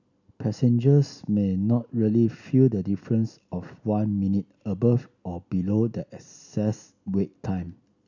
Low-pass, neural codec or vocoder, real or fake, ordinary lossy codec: 7.2 kHz; none; real; none